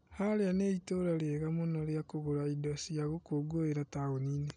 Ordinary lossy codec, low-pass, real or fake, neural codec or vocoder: none; 9.9 kHz; real; none